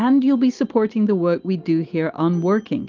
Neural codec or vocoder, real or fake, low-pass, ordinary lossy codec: none; real; 7.2 kHz; Opus, 24 kbps